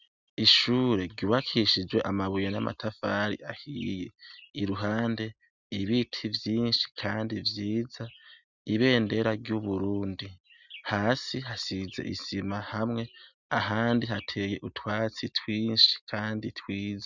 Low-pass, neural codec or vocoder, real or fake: 7.2 kHz; none; real